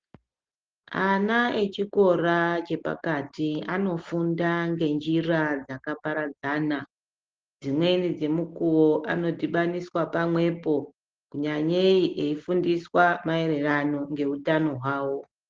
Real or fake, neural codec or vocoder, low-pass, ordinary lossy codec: real; none; 7.2 kHz; Opus, 16 kbps